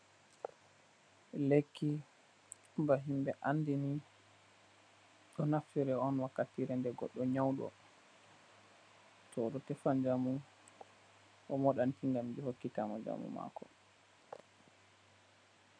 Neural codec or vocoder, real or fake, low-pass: none; real; 9.9 kHz